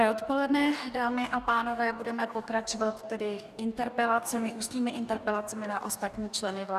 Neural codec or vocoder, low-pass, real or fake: codec, 44.1 kHz, 2.6 kbps, DAC; 14.4 kHz; fake